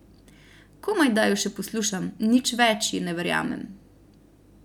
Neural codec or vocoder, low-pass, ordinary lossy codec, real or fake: none; 19.8 kHz; none; real